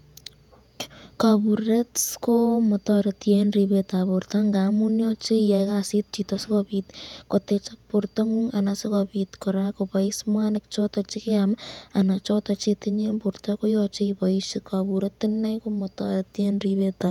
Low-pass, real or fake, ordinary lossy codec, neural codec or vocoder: 19.8 kHz; fake; none; vocoder, 48 kHz, 128 mel bands, Vocos